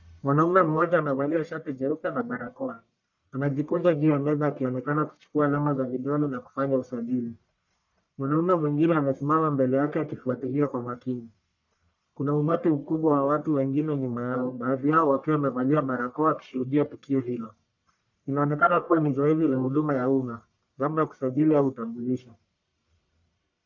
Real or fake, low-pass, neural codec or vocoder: fake; 7.2 kHz; codec, 44.1 kHz, 1.7 kbps, Pupu-Codec